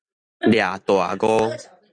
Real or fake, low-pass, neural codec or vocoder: fake; 9.9 kHz; vocoder, 44.1 kHz, 128 mel bands every 256 samples, BigVGAN v2